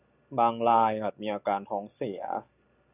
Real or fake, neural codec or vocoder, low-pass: real; none; 3.6 kHz